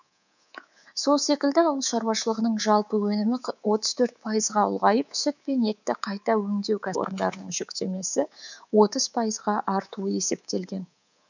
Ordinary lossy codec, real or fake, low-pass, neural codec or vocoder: none; fake; 7.2 kHz; codec, 24 kHz, 3.1 kbps, DualCodec